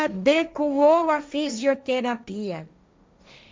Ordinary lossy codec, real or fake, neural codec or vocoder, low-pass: none; fake; codec, 16 kHz, 1.1 kbps, Voila-Tokenizer; none